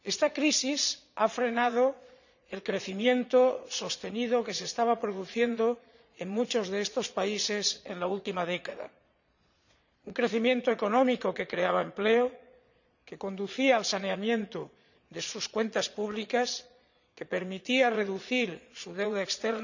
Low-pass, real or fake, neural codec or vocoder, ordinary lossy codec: 7.2 kHz; fake; vocoder, 22.05 kHz, 80 mel bands, Vocos; none